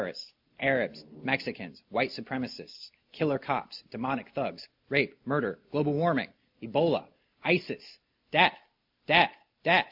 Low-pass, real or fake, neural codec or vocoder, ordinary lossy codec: 5.4 kHz; real; none; MP3, 48 kbps